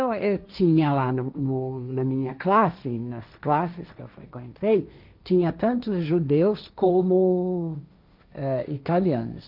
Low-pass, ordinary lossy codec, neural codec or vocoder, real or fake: 5.4 kHz; none; codec, 16 kHz, 1.1 kbps, Voila-Tokenizer; fake